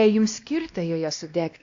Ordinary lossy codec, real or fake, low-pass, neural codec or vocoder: AAC, 64 kbps; fake; 7.2 kHz; codec, 16 kHz, 1 kbps, X-Codec, WavLM features, trained on Multilingual LibriSpeech